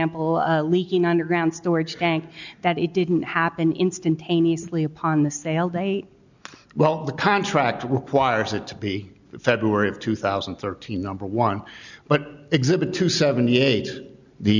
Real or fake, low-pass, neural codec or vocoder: real; 7.2 kHz; none